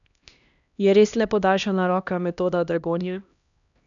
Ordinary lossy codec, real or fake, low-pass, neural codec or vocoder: none; fake; 7.2 kHz; codec, 16 kHz, 1 kbps, X-Codec, HuBERT features, trained on LibriSpeech